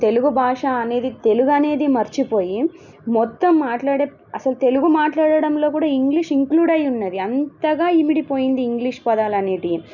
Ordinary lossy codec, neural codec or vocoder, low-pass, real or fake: none; none; 7.2 kHz; real